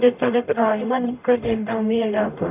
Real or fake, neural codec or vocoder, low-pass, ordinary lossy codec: fake; codec, 44.1 kHz, 0.9 kbps, DAC; 3.6 kHz; none